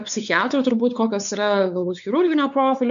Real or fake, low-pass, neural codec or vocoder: fake; 7.2 kHz; codec, 16 kHz, 4 kbps, X-Codec, WavLM features, trained on Multilingual LibriSpeech